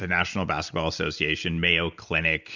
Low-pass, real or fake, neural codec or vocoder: 7.2 kHz; real; none